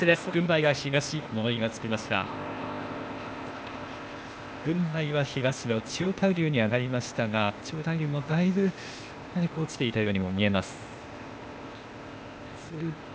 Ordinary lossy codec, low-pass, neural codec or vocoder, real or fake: none; none; codec, 16 kHz, 0.8 kbps, ZipCodec; fake